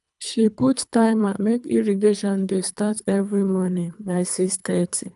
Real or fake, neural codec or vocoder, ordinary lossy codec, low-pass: fake; codec, 24 kHz, 3 kbps, HILCodec; none; 10.8 kHz